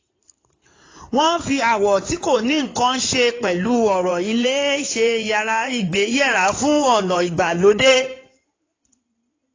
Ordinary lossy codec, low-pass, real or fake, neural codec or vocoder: AAC, 32 kbps; 7.2 kHz; fake; codec, 16 kHz in and 24 kHz out, 2.2 kbps, FireRedTTS-2 codec